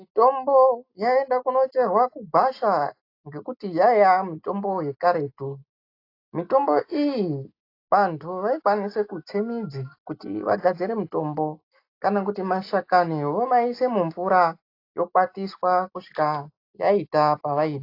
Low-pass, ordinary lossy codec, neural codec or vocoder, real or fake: 5.4 kHz; AAC, 32 kbps; none; real